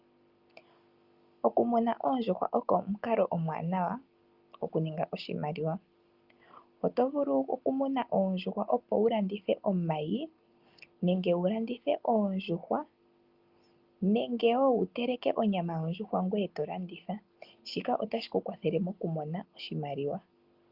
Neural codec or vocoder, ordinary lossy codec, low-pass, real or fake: none; Opus, 32 kbps; 5.4 kHz; real